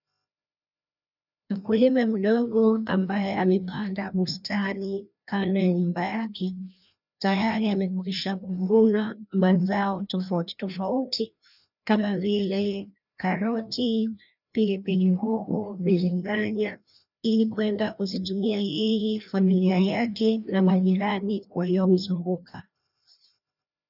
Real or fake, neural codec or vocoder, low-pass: fake; codec, 16 kHz, 1 kbps, FreqCodec, larger model; 5.4 kHz